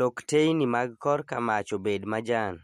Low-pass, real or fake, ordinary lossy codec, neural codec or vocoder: 14.4 kHz; real; MP3, 64 kbps; none